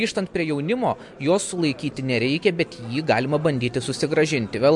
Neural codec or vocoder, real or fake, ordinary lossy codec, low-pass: none; real; MP3, 64 kbps; 10.8 kHz